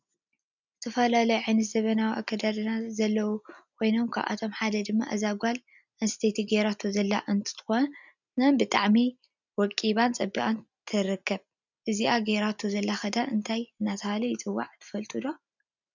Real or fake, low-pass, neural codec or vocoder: real; 7.2 kHz; none